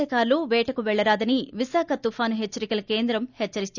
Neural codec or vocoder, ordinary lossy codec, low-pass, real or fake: none; none; 7.2 kHz; real